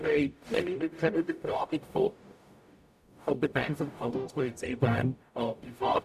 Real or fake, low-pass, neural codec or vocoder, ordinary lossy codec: fake; 14.4 kHz; codec, 44.1 kHz, 0.9 kbps, DAC; none